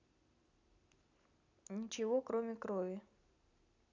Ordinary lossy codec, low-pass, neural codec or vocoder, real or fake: none; 7.2 kHz; none; real